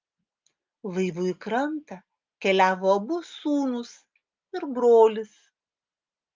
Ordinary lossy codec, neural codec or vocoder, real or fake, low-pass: Opus, 24 kbps; none; real; 7.2 kHz